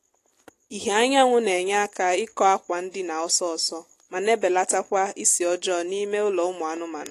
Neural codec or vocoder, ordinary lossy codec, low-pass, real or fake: none; AAC, 48 kbps; 14.4 kHz; real